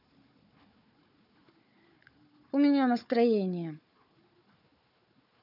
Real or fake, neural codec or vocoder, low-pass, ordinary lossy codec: fake; codec, 16 kHz, 4 kbps, FunCodec, trained on Chinese and English, 50 frames a second; 5.4 kHz; none